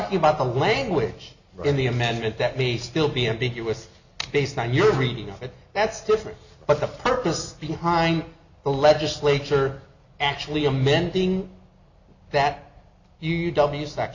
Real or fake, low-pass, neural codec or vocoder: real; 7.2 kHz; none